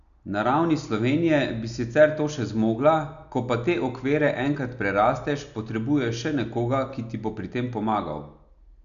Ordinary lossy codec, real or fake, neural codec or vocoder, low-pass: none; real; none; 7.2 kHz